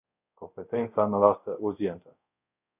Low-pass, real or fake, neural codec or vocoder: 3.6 kHz; fake; codec, 24 kHz, 0.5 kbps, DualCodec